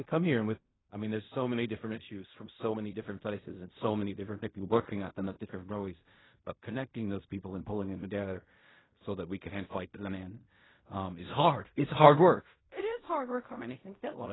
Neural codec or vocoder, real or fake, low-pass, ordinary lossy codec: codec, 16 kHz in and 24 kHz out, 0.4 kbps, LongCat-Audio-Codec, fine tuned four codebook decoder; fake; 7.2 kHz; AAC, 16 kbps